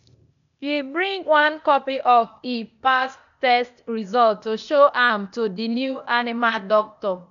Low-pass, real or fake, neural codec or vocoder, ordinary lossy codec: 7.2 kHz; fake; codec, 16 kHz, 0.8 kbps, ZipCodec; none